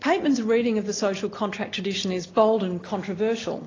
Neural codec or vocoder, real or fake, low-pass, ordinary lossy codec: none; real; 7.2 kHz; AAC, 32 kbps